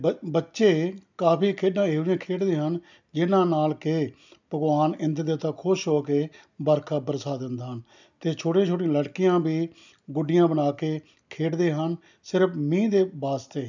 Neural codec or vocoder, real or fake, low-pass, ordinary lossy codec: none; real; 7.2 kHz; none